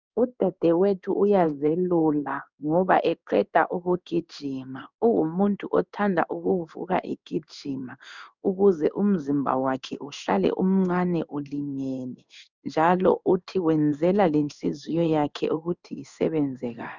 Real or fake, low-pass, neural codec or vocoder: fake; 7.2 kHz; codec, 16 kHz in and 24 kHz out, 1 kbps, XY-Tokenizer